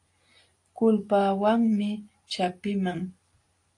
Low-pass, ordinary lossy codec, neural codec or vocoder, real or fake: 10.8 kHz; AAC, 48 kbps; none; real